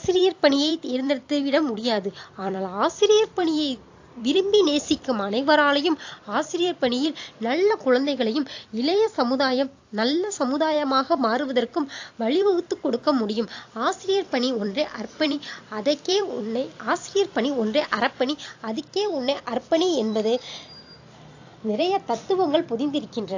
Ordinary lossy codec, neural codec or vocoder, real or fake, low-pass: AAC, 48 kbps; vocoder, 44.1 kHz, 128 mel bands every 256 samples, BigVGAN v2; fake; 7.2 kHz